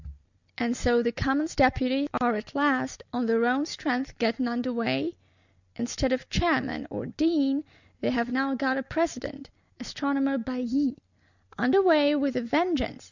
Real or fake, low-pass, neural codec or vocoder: real; 7.2 kHz; none